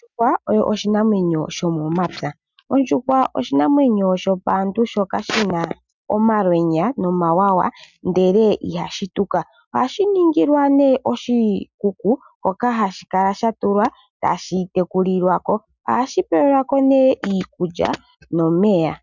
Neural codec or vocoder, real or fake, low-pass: none; real; 7.2 kHz